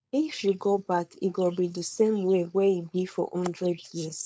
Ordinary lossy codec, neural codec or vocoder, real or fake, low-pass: none; codec, 16 kHz, 4.8 kbps, FACodec; fake; none